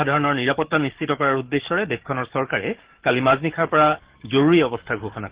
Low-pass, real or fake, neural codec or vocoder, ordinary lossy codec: 3.6 kHz; fake; codec, 44.1 kHz, 7.8 kbps, DAC; Opus, 16 kbps